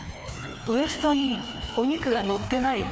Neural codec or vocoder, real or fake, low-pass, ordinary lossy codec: codec, 16 kHz, 2 kbps, FreqCodec, larger model; fake; none; none